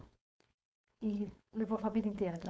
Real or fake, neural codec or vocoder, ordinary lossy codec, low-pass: fake; codec, 16 kHz, 4.8 kbps, FACodec; none; none